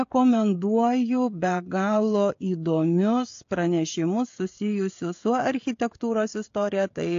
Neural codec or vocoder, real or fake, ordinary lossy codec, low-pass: codec, 16 kHz, 16 kbps, FreqCodec, smaller model; fake; AAC, 48 kbps; 7.2 kHz